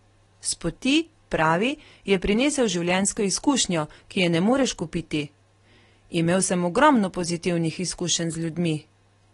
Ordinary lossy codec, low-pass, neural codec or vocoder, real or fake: AAC, 32 kbps; 10.8 kHz; none; real